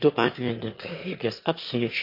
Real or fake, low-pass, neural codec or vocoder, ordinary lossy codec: fake; 5.4 kHz; autoencoder, 22.05 kHz, a latent of 192 numbers a frame, VITS, trained on one speaker; MP3, 32 kbps